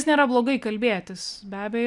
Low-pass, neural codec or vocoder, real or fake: 10.8 kHz; none; real